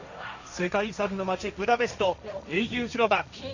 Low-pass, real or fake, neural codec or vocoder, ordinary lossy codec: 7.2 kHz; fake; codec, 16 kHz, 1.1 kbps, Voila-Tokenizer; none